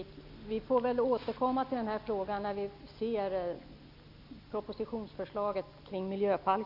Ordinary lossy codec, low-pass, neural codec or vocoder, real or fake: none; 5.4 kHz; none; real